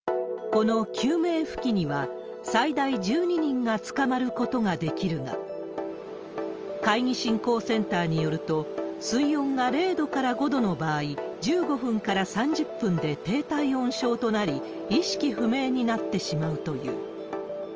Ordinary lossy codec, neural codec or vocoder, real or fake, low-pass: Opus, 24 kbps; none; real; 7.2 kHz